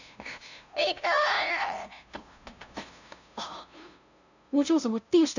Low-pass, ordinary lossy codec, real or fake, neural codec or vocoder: 7.2 kHz; none; fake; codec, 16 kHz, 0.5 kbps, FunCodec, trained on LibriTTS, 25 frames a second